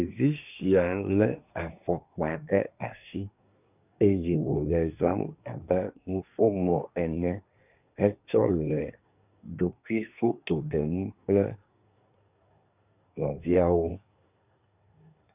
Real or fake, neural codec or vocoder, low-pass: fake; codec, 24 kHz, 1 kbps, SNAC; 3.6 kHz